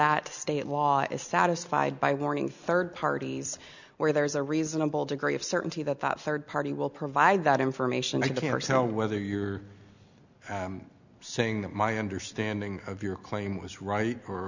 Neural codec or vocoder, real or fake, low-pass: none; real; 7.2 kHz